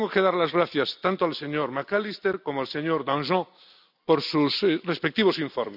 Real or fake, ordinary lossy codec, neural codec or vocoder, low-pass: real; none; none; 5.4 kHz